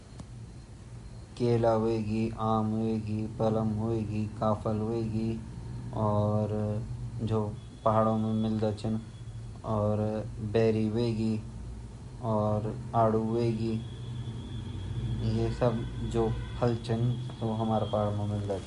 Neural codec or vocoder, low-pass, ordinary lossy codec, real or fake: none; 10.8 kHz; MP3, 48 kbps; real